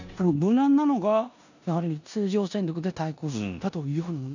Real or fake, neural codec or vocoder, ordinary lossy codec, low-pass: fake; codec, 16 kHz in and 24 kHz out, 0.9 kbps, LongCat-Audio-Codec, four codebook decoder; none; 7.2 kHz